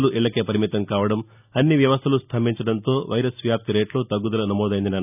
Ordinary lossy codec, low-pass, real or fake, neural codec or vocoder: none; 3.6 kHz; real; none